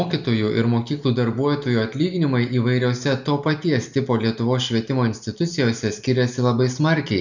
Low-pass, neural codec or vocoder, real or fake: 7.2 kHz; none; real